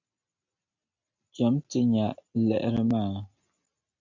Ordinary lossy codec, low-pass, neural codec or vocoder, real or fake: MP3, 64 kbps; 7.2 kHz; none; real